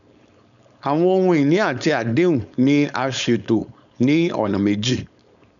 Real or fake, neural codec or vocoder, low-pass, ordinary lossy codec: fake; codec, 16 kHz, 4.8 kbps, FACodec; 7.2 kHz; none